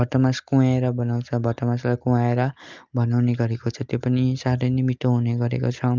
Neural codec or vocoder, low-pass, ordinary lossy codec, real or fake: none; 7.2 kHz; Opus, 24 kbps; real